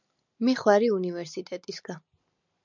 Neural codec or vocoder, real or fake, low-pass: none; real; 7.2 kHz